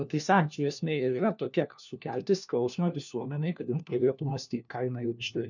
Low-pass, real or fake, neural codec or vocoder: 7.2 kHz; fake; codec, 16 kHz, 1 kbps, FunCodec, trained on LibriTTS, 50 frames a second